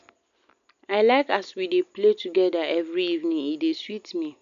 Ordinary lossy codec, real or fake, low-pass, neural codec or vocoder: AAC, 96 kbps; real; 7.2 kHz; none